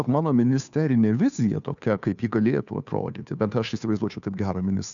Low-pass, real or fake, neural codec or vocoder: 7.2 kHz; fake; codec, 16 kHz, 2 kbps, FunCodec, trained on Chinese and English, 25 frames a second